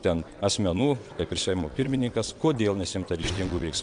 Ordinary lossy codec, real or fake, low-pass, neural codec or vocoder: MP3, 64 kbps; fake; 9.9 kHz; vocoder, 22.05 kHz, 80 mel bands, WaveNeXt